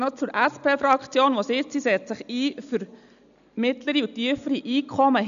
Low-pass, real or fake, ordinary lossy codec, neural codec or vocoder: 7.2 kHz; real; none; none